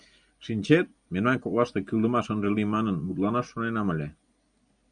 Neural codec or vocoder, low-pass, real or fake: none; 9.9 kHz; real